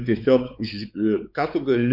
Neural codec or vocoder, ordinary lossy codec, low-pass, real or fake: codec, 16 kHz, 4 kbps, X-Codec, WavLM features, trained on Multilingual LibriSpeech; MP3, 48 kbps; 5.4 kHz; fake